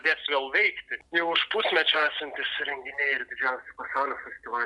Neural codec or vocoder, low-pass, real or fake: none; 10.8 kHz; real